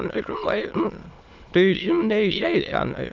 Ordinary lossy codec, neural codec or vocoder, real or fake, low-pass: Opus, 32 kbps; autoencoder, 22.05 kHz, a latent of 192 numbers a frame, VITS, trained on many speakers; fake; 7.2 kHz